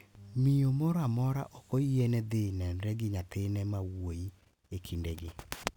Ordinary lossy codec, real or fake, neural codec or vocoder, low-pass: none; real; none; 19.8 kHz